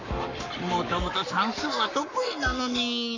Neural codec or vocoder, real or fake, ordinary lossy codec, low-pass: codec, 44.1 kHz, 7.8 kbps, Pupu-Codec; fake; none; 7.2 kHz